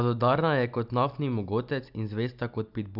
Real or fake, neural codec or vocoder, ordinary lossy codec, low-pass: real; none; none; 5.4 kHz